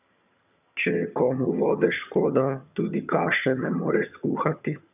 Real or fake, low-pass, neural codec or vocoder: fake; 3.6 kHz; vocoder, 22.05 kHz, 80 mel bands, HiFi-GAN